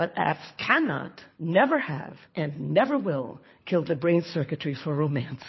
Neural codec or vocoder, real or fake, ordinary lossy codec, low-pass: codec, 24 kHz, 6 kbps, HILCodec; fake; MP3, 24 kbps; 7.2 kHz